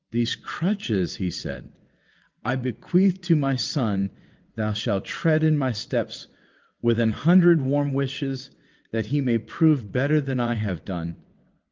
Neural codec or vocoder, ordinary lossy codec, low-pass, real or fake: vocoder, 22.05 kHz, 80 mel bands, WaveNeXt; Opus, 32 kbps; 7.2 kHz; fake